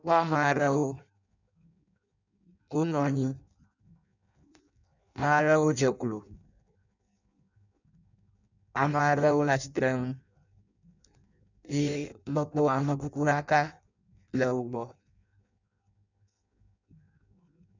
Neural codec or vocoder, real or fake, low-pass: codec, 16 kHz in and 24 kHz out, 0.6 kbps, FireRedTTS-2 codec; fake; 7.2 kHz